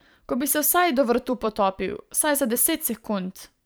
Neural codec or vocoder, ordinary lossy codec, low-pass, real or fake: vocoder, 44.1 kHz, 128 mel bands every 512 samples, BigVGAN v2; none; none; fake